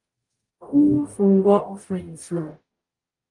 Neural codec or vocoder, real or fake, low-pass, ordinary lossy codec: codec, 44.1 kHz, 0.9 kbps, DAC; fake; 10.8 kHz; Opus, 32 kbps